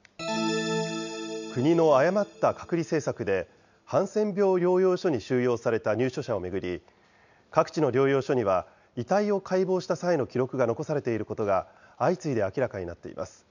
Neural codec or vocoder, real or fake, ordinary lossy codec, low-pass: none; real; none; 7.2 kHz